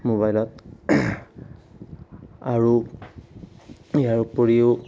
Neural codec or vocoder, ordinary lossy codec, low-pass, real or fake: none; none; none; real